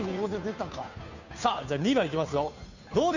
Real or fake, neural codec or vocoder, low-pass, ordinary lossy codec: fake; codec, 16 kHz, 2 kbps, FunCodec, trained on Chinese and English, 25 frames a second; 7.2 kHz; none